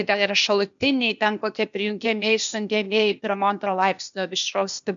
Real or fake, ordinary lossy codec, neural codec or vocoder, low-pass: fake; MP3, 64 kbps; codec, 16 kHz, 0.8 kbps, ZipCodec; 7.2 kHz